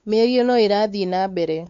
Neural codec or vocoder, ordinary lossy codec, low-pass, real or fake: codec, 16 kHz, 4 kbps, X-Codec, WavLM features, trained on Multilingual LibriSpeech; MP3, 64 kbps; 7.2 kHz; fake